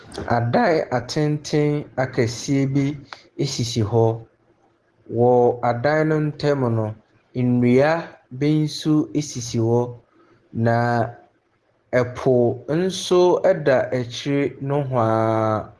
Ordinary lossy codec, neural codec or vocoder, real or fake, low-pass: Opus, 16 kbps; none; real; 10.8 kHz